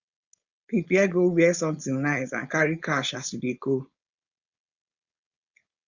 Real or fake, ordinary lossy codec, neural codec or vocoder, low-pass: fake; Opus, 64 kbps; codec, 16 kHz, 4.8 kbps, FACodec; 7.2 kHz